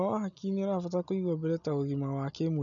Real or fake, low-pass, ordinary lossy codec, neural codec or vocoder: real; 7.2 kHz; none; none